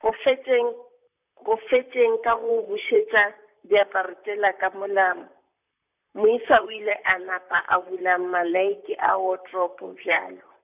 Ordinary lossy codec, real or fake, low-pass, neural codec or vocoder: none; fake; 3.6 kHz; vocoder, 44.1 kHz, 128 mel bands, Pupu-Vocoder